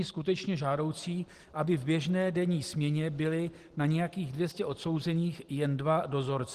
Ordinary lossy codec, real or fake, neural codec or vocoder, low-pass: Opus, 16 kbps; real; none; 14.4 kHz